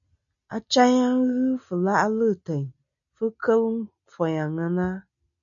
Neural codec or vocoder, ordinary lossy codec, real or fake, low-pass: none; MP3, 96 kbps; real; 7.2 kHz